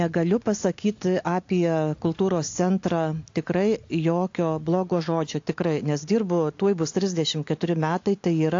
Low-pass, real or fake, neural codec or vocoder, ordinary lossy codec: 7.2 kHz; real; none; AAC, 48 kbps